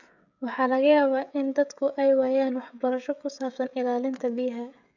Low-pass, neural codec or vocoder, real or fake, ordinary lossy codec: 7.2 kHz; codec, 16 kHz, 16 kbps, FreqCodec, smaller model; fake; none